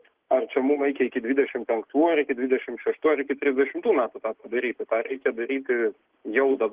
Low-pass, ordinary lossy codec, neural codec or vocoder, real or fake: 3.6 kHz; Opus, 16 kbps; none; real